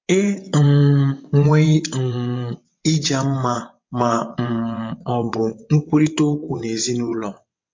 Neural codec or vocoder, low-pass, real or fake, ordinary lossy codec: vocoder, 22.05 kHz, 80 mel bands, WaveNeXt; 7.2 kHz; fake; MP3, 48 kbps